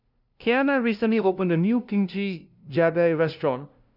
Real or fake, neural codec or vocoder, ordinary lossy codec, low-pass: fake; codec, 16 kHz, 0.5 kbps, FunCodec, trained on LibriTTS, 25 frames a second; none; 5.4 kHz